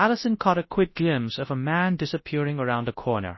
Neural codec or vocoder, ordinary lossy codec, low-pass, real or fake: codec, 24 kHz, 0.9 kbps, WavTokenizer, large speech release; MP3, 24 kbps; 7.2 kHz; fake